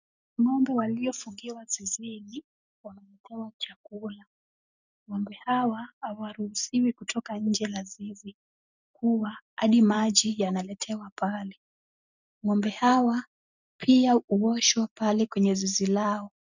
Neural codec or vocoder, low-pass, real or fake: none; 7.2 kHz; real